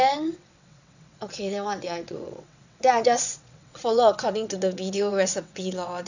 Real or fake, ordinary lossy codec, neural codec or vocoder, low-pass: fake; none; vocoder, 22.05 kHz, 80 mel bands, WaveNeXt; 7.2 kHz